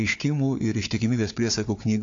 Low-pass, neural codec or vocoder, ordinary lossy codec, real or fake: 7.2 kHz; codec, 16 kHz, 4 kbps, FunCodec, trained on Chinese and English, 50 frames a second; AAC, 48 kbps; fake